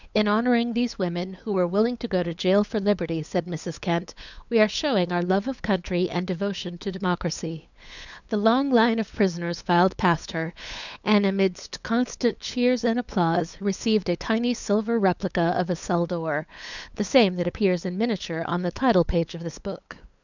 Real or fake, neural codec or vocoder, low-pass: fake; codec, 16 kHz, 8 kbps, FunCodec, trained on LibriTTS, 25 frames a second; 7.2 kHz